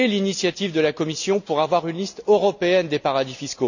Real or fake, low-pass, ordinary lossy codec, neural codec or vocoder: real; 7.2 kHz; none; none